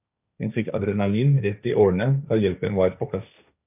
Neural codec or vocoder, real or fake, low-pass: codec, 16 kHz, 1.1 kbps, Voila-Tokenizer; fake; 3.6 kHz